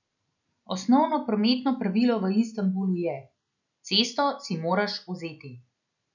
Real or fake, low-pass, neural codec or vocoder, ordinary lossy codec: real; 7.2 kHz; none; none